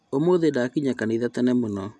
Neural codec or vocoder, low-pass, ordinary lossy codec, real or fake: none; none; none; real